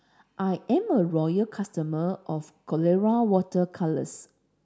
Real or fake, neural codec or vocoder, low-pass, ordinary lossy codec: real; none; none; none